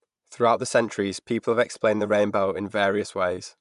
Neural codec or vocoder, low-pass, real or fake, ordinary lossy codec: vocoder, 24 kHz, 100 mel bands, Vocos; 10.8 kHz; fake; MP3, 96 kbps